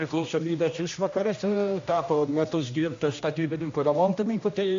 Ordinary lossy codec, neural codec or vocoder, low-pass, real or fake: AAC, 48 kbps; codec, 16 kHz, 1 kbps, X-Codec, HuBERT features, trained on general audio; 7.2 kHz; fake